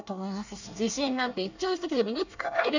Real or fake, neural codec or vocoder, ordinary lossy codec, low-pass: fake; codec, 24 kHz, 1 kbps, SNAC; none; 7.2 kHz